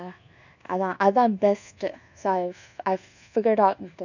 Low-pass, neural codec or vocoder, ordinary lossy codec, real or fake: 7.2 kHz; codec, 24 kHz, 1.2 kbps, DualCodec; none; fake